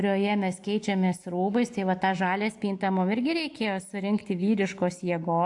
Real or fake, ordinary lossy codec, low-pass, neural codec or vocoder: real; AAC, 64 kbps; 10.8 kHz; none